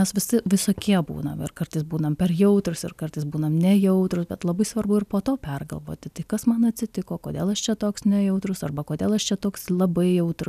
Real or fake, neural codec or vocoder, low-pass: real; none; 14.4 kHz